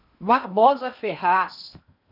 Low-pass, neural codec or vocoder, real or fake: 5.4 kHz; codec, 16 kHz in and 24 kHz out, 0.8 kbps, FocalCodec, streaming, 65536 codes; fake